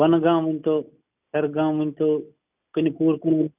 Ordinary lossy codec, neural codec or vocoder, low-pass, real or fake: none; none; 3.6 kHz; real